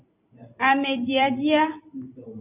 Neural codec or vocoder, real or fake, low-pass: vocoder, 44.1 kHz, 128 mel bands every 256 samples, BigVGAN v2; fake; 3.6 kHz